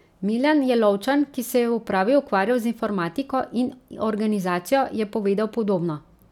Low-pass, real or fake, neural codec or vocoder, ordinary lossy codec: 19.8 kHz; real; none; none